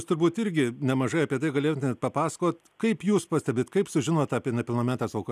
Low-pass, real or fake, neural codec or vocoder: 14.4 kHz; real; none